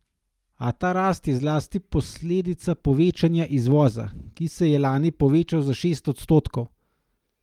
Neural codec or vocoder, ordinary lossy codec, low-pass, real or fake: none; Opus, 32 kbps; 19.8 kHz; real